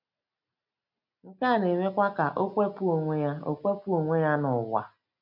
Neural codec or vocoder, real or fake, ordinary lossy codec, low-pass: none; real; none; 5.4 kHz